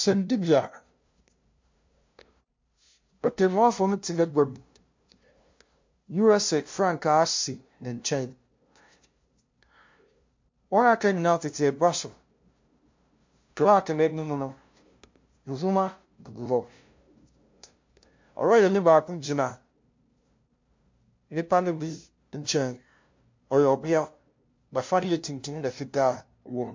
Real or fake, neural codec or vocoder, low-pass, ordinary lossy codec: fake; codec, 16 kHz, 0.5 kbps, FunCodec, trained on LibriTTS, 25 frames a second; 7.2 kHz; MP3, 48 kbps